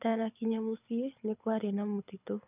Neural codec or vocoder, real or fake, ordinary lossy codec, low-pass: codec, 24 kHz, 6 kbps, HILCodec; fake; none; 3.6 kHz